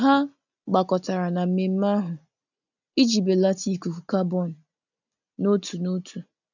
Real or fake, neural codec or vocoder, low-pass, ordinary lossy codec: real; none; 7.2 kHz; none